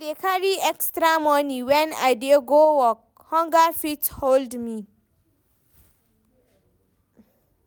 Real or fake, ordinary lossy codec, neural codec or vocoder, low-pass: real; none; none; none